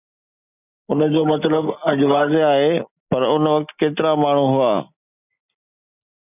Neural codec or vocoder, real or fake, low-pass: none; real; 3.6 kHz